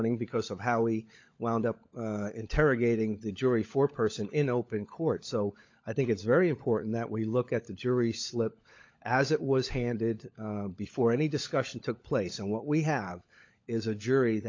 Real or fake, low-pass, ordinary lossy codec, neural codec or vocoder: fake; 7.2 kHz; MP3, 64 kbps; codec, 16 kHz, 16 kbps, FunCodec, trained on LibriTTS, 50 frames a second